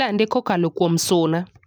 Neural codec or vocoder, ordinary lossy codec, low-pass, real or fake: none; none; none; real